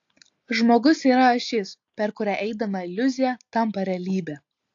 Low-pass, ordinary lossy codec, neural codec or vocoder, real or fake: 7.2 kHz; AAC, 48 kbps; none; real